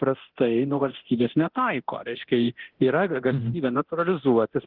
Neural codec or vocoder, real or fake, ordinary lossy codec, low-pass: codec, 24 kHz, 0.9 kbps, DualCodec; fake; Opus, 16 kbps; 5.4 kHz